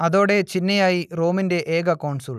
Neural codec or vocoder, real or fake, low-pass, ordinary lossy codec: none; real; 14.4 kHz; none